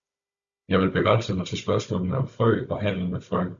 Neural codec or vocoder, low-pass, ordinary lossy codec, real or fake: codec, 16 kHz, 16 kbps, FunCodec, trained on Chinese and English, 50 frames a second; 7.2 kHz; Opus, 64 kbps; fake